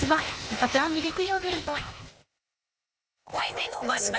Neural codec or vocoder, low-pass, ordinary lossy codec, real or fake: codec, 16 kHz, 0.8 kbps, ZipCodec; none; none; fake